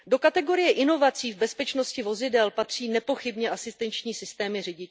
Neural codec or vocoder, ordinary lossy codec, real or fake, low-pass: none; none; real; none